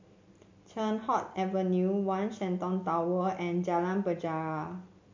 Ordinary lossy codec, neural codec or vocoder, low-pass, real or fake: MP3, 48 kbps; none; 7.2 kHz; real